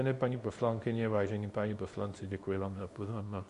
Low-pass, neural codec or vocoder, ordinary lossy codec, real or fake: 10.8 kHz; codec, 24 kHz, 0.9 kbps, WavTokenizer, small release; MP3, 48 kbps; fake